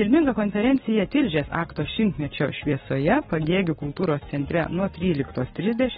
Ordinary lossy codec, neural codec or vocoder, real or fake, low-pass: AAC, 16 kbps; codec, 44.1 kHz, 7.8 kbps, Pupu-Codec; fake; 19.8 kHz